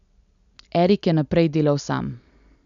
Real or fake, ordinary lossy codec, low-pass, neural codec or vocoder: real; none; 7.2 kHz; none